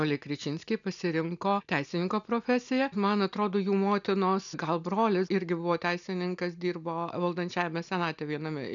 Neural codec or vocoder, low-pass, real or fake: none; 7.2 kHz; real